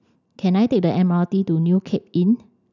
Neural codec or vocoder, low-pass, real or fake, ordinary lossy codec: none; 7.2 kHz; real; none